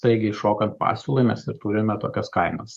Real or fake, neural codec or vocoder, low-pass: fake; codec, 44.1 kHz, 7.8 kbps, DAC; 14.4 kHz